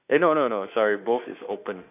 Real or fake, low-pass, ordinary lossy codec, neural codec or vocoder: fake; 3.6 kHz; none; autoencoder, 48 kHz, 32 numbers a frame, DAC-VAE, trained on Japanese speech